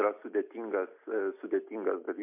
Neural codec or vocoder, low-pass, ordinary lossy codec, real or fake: none; 3.6 kHz; MP3, 32 kbps; real